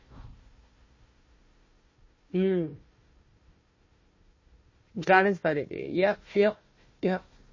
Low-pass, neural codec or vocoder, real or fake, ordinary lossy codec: 7.2 kHz; codec, 16 kHz, 1 kbps, FunCodec, trained on Chinese and English, 50 frames a second; fake; MP3, 32 kbps